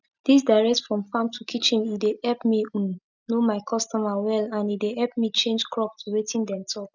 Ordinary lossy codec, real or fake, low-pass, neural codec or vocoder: none; real; 7.2 kHz; none